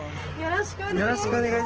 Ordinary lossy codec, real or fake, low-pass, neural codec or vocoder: Opus, 16 kbps; real; 7.2 kHz; none